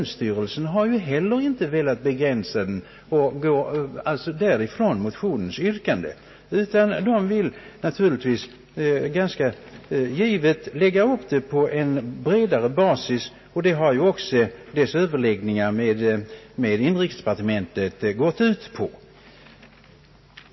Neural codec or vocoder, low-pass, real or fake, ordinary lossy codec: none; 7.2 kHz; real; MP3, 24 kbps